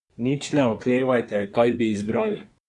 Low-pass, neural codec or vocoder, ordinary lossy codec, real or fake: 10.8 kHz; codec, 24 kHz, 1 kbps, SNAC; none; fake